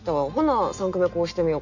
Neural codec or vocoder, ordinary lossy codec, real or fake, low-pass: none; none; real; 7.2 kHz